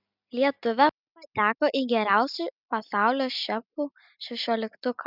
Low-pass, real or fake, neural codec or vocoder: 5.4 kHz; real; none